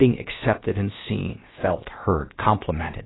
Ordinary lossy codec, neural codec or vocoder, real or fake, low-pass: AAC, 16 kbps; codec, 16 kHz, 0.7 kbps, FocalCodec; fake; 7.2 kHz